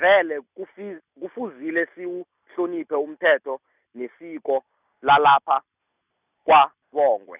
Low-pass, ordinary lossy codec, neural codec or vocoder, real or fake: 3.6 kHz; none; none; real